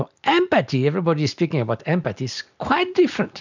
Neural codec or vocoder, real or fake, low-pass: none; real; 7.2 kHz